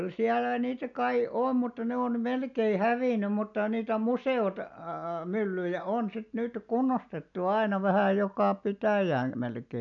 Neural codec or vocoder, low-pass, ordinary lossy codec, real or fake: none; 7.2 kHz; none; real